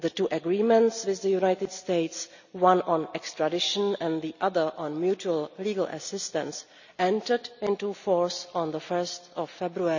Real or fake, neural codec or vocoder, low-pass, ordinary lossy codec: real; none; 7.2 kHz; none